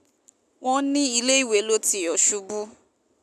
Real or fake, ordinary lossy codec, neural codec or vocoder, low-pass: real; none; none; 14.4 kHz